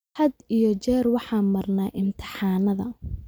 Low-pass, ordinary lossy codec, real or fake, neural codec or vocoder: none; none; real; none